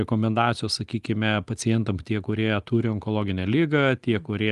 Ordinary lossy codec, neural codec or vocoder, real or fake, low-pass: Opus, 24 kbps; none; real; 10.8 kHz